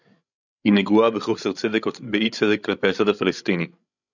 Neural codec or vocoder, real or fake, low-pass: codec, 16 kHz, 16 kbps, FreqCodec, larger model; fake; 7.2 kHz